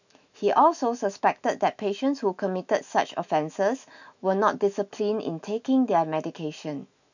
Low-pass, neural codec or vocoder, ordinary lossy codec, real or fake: 7.2 kHz; autoencoder, 48 kHz, 128 numbers a frame, DAC-VAE, trained on Japanese speech; none; fake